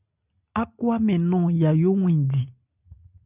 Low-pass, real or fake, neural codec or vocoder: 3.6 kHz; real; none